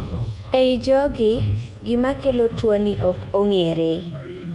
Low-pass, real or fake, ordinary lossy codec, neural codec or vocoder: 10.8 kHz; fake; none; codec, 24 kHz, 1.2 kbps, DualCodec